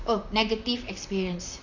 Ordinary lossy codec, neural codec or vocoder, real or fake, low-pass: none; vocoder, 44.1 kHz, 128 mel bands, Pupu-Vocoder; fake; 7.2 kHz